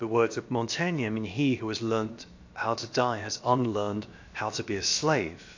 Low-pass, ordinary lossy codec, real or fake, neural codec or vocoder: 7.2 kHz; AAC, 48 kbps; fake; codec, 16 kHz, about 1 kbps, DyCAST, with the encoder's durations